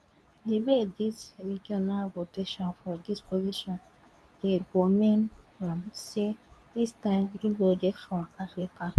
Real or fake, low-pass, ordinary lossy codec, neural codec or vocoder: fake; none; none; codec, 24 kHz, 0.9 kbps, WavTokenizer, medium speech release version 2